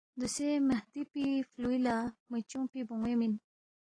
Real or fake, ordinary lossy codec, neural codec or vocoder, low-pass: real; AAC, 32 kbps; none; 9.9 kHz